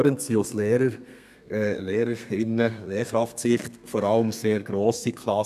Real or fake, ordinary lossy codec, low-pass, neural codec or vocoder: fake; none; 14.4 kHz; codec, 32 kHz, 1.9 kbps, SNAC